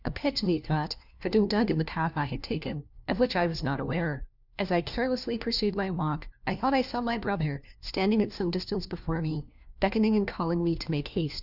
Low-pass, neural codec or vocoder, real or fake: 5.4 kHz; codec, 16 kHz, 1 kbps, FunCodec, trained on LibriTTS, 50 frames a second; fake